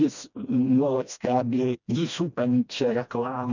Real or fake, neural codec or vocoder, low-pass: fake; codec, 16 kHz, 1 kbps, FreqCodec, smaller model; 7.2 kHz